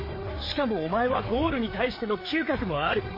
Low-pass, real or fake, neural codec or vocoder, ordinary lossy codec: 5.4 kHz; fake; codec, 16 kHz, 4 kbps, FreqCodec, larger model; MP3, 24 kbps